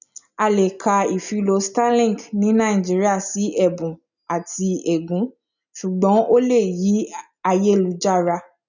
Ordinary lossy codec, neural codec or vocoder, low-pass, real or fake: none; none; 7.2 kHz; real